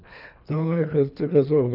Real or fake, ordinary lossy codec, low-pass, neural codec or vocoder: fake; none; 5.4 kHz; codec, 24 kHz, 3 kbps, HILCodec